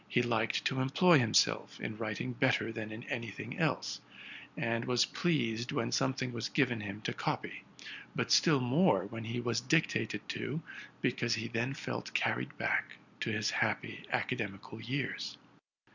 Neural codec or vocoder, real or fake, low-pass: none; real; 7.2 kHz